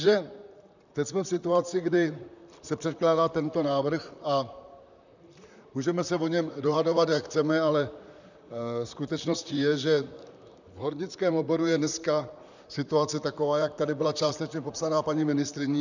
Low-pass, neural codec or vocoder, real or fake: 7.2 kHz; vocoder, 44.1 kHz, 128 mel bands, Pupu-Vocoder; fake